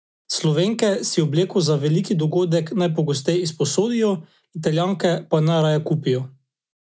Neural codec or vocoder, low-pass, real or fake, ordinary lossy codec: none; none; real; none